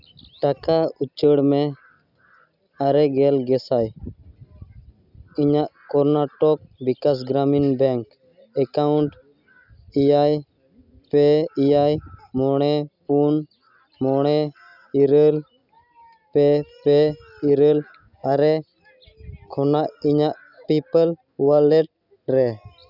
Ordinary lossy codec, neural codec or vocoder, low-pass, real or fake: none; none; 5.4 kHz; real